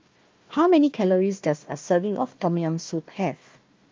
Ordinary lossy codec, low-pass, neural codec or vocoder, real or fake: Opus, 32 kbps; 7.2 kHz; codec, 16 kHz, 1 kbps, FunCodec, trained on Chinese and English, 50 frames a second; fake